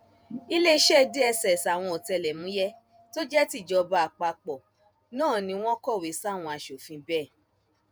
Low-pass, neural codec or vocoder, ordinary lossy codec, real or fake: none; vocoder, 48 kHz, 128 mel bands, Vocos; none; fake